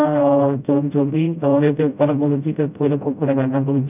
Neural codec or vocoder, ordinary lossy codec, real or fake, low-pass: codec, 16 kHz, 0.5 kbps, FreqCodec, smaller model; none; fake; 3.6 kHz